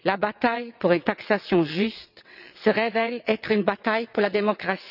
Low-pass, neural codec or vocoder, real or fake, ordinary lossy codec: 5.4 kHz; vocoder, 22.05 kHz, 80 mel bands, WaveNeXt; fake; none